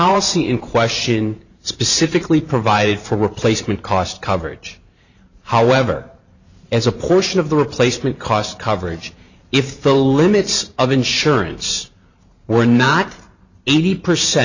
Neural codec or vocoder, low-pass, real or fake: vocoder, 44.1 kHz, 128 mel bands every 512 samples, BigVGAN v2; 7.2 kHz; fake